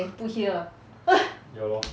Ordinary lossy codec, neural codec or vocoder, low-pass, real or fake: none; none; none; real